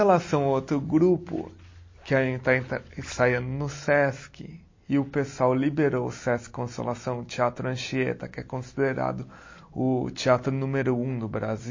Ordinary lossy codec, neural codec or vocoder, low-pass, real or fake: MP3, 32 kbps; none; 7.2 kHz; real